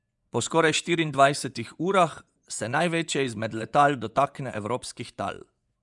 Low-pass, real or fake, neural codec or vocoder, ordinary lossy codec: 10.8 kHz; fake; vocoder, 24 kHz, 100 mel bands, Vocos; none